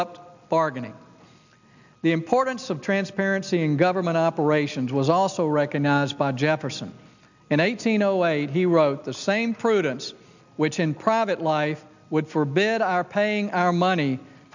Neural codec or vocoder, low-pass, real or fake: none; 7.2 kHz; real